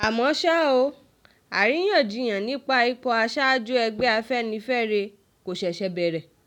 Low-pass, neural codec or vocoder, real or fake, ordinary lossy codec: 19.8 kHz; none; real; none